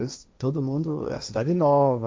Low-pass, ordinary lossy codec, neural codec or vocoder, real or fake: none; none; codec, 16 kHz, 1.1 kbps, Voila-Tokenizer; fake